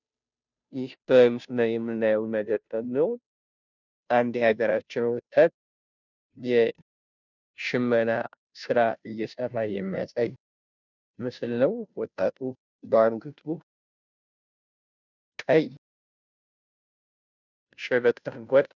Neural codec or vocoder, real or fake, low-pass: codec, 16 kHz, 0.5 kbps, FunCodec, trained on Chinese and English, 25 frames a second; fake; 7.2 kHz